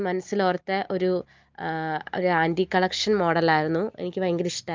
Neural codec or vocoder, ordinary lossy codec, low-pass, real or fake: none; Opus, 24 kbps; 7.2 kHz; real